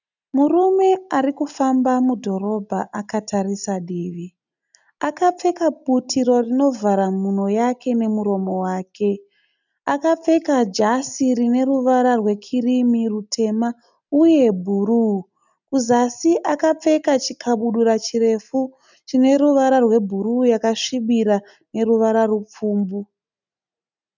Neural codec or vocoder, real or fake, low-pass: none; real; 7.2 kHz